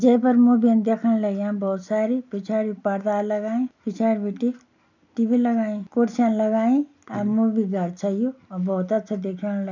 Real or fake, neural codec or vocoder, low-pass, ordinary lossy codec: real; none; 7.2 kHz; none